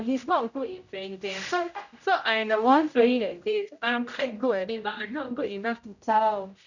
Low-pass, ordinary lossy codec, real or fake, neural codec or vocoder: 7.2 kHz; none; fake; codec, 16 kHz, 0.5 kbps, X-Codec, HuBERT features, trained on general audio